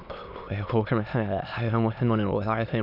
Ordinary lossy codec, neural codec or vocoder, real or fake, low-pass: none; autoencoder, 22.05 kHz, a latent of 192 numbers a frame, VITS, trained on many speakers; fake; 5.4 kHz